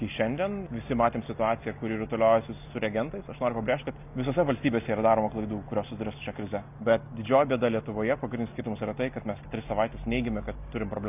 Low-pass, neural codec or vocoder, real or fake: 3.6 kHz; none; real